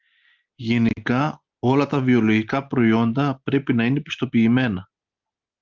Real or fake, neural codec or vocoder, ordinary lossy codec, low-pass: real; none; Opus, 24 kbps; 7.2 kHz